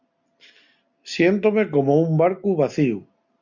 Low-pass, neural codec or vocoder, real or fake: 7.2 kHz; none; real